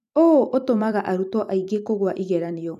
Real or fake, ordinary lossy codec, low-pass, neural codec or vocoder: fake; AAC, 96 kbps; 14.4 kHz; vocoder, 44.1 kHz, 128 mel bands every 256 samples, BigVGAN v2